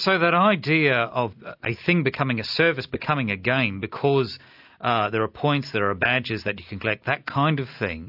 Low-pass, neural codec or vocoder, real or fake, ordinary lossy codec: 5.4 kHz; none; real; AAC, 48 kbps